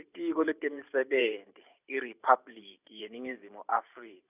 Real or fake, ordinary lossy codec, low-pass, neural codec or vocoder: fake; none; 3.6 kHz; vocoder, 44.1 kHz, 128 mel bands every 512 samples, BigVGAN v2